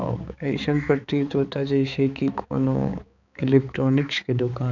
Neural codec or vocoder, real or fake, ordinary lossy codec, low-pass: codec, 16 kHz, 4 kbps, X-Codec, HuBERT features, trained on balanced general audio; fake; none; 7.2 kHz